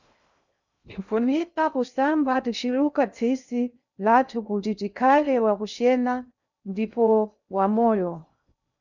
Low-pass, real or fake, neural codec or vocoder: 7.2 kHz; fake; codec, 16 kHz in and 24 kHz out, 0.6 kbps, FocalCodec, streaming, 2048 codes